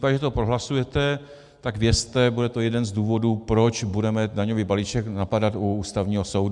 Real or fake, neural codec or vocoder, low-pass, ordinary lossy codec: real; none; 10.8 kHz; MP3, 96 kbps